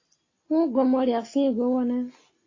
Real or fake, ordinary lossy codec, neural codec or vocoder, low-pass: real; AAC, 32 kbps; none; 7.2 kHz